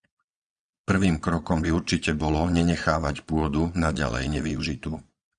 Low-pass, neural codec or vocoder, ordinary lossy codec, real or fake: 9.9 kHz; vocoder, 22.05 kHz, 80 mel bands, Vocos; Opus, 64 kbps; fake